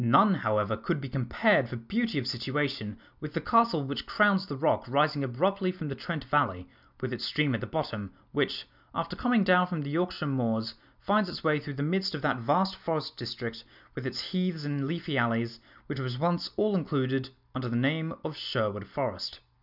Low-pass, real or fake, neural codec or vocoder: 5.4 kHz; real; none